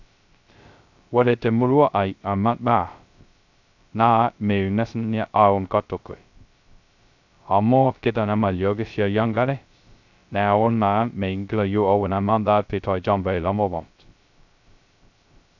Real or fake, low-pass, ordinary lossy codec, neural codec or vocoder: fake; 7.2 kHz; none; codec, 16 kHz, 0.2 kbps, FocalCodec